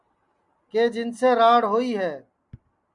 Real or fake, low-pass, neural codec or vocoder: real; 10.8 kHz; none